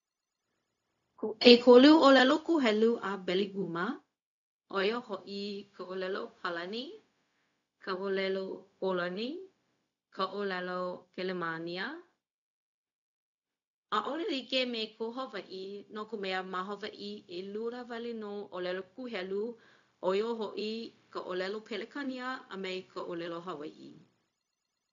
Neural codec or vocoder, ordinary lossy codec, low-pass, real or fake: codec, 16 kHz, 0.4 kbps, LongCat-Audio-Codec; none; 7.2 kHz; fake